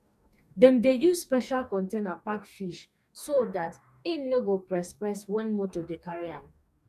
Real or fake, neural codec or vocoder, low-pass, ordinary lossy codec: fake; codec, 44.1 kHz, 2.6 kbps, DAC; 14.4 kHz; none